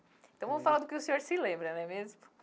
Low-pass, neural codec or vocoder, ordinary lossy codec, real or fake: none; none; none; real